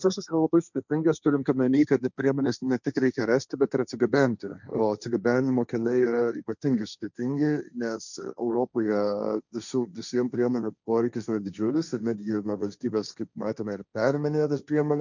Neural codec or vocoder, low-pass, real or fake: codec, 16 kHz, 1.1 kbps, Voila-Tokenizer; 7.2 kHz; fake